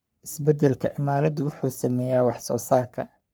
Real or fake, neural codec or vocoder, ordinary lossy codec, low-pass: fake; codec, 44.1 kHz, 3.4 kbps, Pupu-Codec; none; none